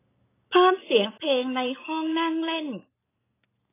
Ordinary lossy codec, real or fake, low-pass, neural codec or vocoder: AAC, 16 kbps; real; 3.6 kHz; none